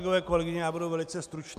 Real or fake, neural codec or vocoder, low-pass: real; none; 14.4 kHz